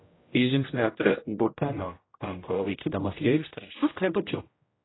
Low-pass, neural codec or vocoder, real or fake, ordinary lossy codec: 7.2 kHz; codec, 16 kHz, 0.5 kbps, X-Codec, HuBERT features, trained on general audio; fake; AAC, 16 kbps